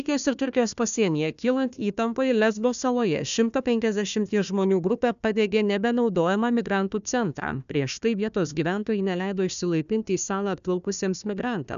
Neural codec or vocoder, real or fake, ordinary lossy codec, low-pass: codec, 16 kHz, 1 kbps, FunCodec, trained on Chinese and English, 50 frames a second; fake; MP3, 96 kbps; 7.2 kHz